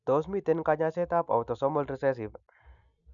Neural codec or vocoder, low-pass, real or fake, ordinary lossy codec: none; 7.2 kHz; real; none